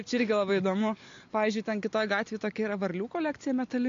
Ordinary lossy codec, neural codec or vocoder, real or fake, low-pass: MP3, 48 kbps; none; real; 7.2 kHz